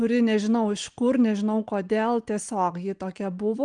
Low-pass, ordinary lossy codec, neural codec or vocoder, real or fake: 9.9 kHz; Opus, 24 kbps; none; real